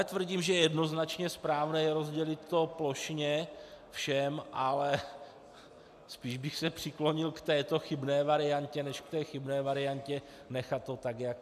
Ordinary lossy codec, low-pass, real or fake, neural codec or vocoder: AAC, 96 kbps; 14.4 kHz; real; none